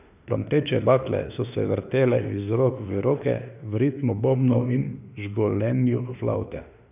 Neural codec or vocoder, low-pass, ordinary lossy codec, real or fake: autoencoder, 48 kHz, 32 numbers a frame, DAC-VAE, trained on Japanese speech; 3.6 kHz; none; fake